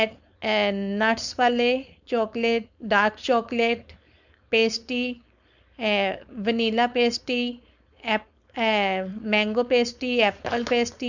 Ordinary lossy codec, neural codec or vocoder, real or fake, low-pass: none; codec, 16 kHz, 4.8 kbps, FACodec; fake; 7.2 kHz